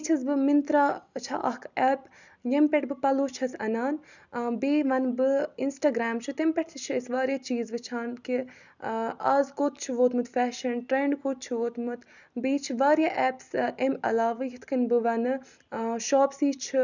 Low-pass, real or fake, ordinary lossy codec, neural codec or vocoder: 7.2 kHz; real; none; none